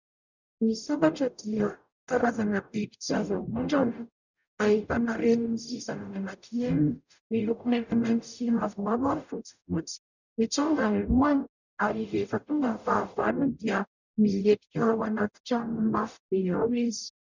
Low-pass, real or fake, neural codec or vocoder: 7.2 kHz; fake; codec, 44.1 kHz, 0.9 kbps, DAC